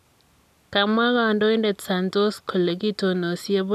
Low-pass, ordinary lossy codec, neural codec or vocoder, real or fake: 14.4 kHz; none; none; real